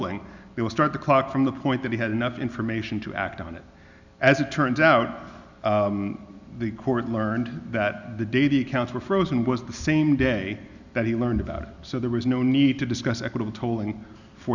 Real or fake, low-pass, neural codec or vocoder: real; 7.2 kHz; none